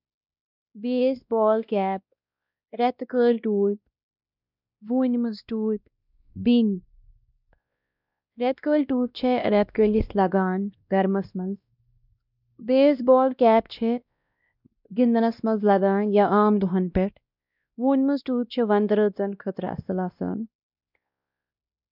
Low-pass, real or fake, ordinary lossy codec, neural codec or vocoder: 5.4 kHz; fake; none; codec, 16 kHz, 1 kbps, X-Codec, WavLM features, trained on Multilingual LibriSpeech